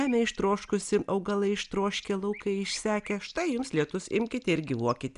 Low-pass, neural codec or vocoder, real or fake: 10.8 kHz; none; real